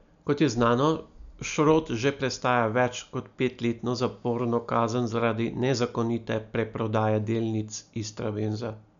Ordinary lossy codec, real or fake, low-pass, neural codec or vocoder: MP3, 96 kbps; real; 7.2 kHz; none